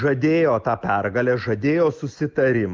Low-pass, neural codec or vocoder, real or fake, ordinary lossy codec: 7.2 kHz; none; real; Opus, 32 kbps